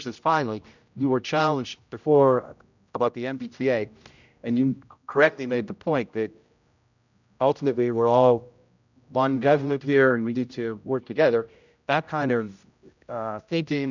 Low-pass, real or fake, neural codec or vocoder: 7.2 kHz; fake; codec, 16 kHz, 0.5 kbps, X-Codec, HuBERT features, trained on general audio